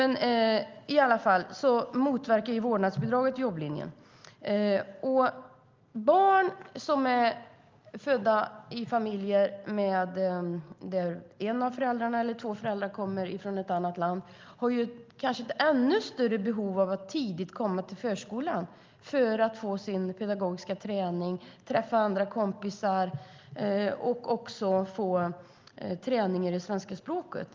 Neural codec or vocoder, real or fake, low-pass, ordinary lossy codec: none; real; 7.2 kHz; Opus, 32 kbps